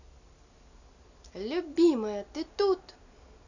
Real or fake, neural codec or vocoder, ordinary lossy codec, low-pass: real; none; none; 7.2 kHz